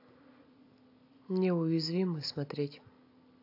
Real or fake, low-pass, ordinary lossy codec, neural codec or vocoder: real; 5.4 kHz; AAC, 32 kbps; none